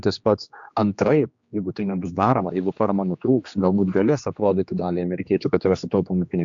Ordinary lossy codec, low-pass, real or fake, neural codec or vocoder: AAC, 64 kbps; 7.2 kHz; fake; codec, 16 kHz, 2 kbps, X-Codec, HuBERT features, trained on general audio